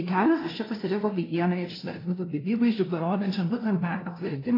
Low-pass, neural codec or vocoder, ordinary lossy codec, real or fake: 5.4 kHz; codec, 16 kHz, 0.5 kbps, FunCodec, trained on LibriTTS, 25 frames a second; AAC, 24 kbps; fake